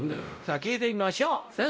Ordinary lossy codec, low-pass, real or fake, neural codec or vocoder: none; none; fake; codec, 16 kHz, 0.5 kbps, X-Codec, WavLM features, trained on Multilingual LibriSpeech